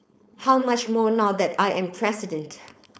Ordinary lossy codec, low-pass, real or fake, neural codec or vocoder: none; none; fake; codec, 16 kHz, 4.8 kbps, FACodec